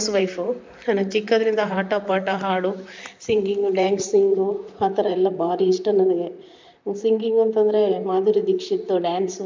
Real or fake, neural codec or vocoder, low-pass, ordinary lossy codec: fake; vocoder, 44.1 kHz, 128 mel bands, Pupu-Vocoder; 7.2 kHz; MP3, 64 kbps